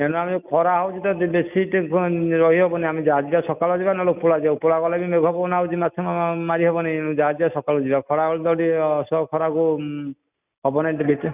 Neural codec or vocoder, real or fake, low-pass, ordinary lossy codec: none; real; 3.6 kHz; none